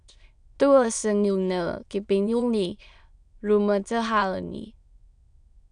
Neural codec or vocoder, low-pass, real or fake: autoencoder, 22.05 kHz, a latent of 192 numbers a frame, VITS, trained on many speakers; 9.9 kHz; fake